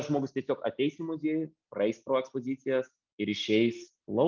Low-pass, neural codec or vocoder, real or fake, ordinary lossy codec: 7.2 kHz; none; real; Opus, 24 kbps